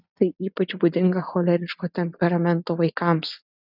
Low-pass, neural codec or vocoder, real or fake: 5.4 kHz; none; real